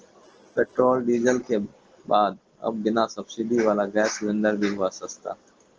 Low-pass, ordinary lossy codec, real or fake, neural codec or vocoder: 7.2 kHz; Opus, 16 kbps; real; none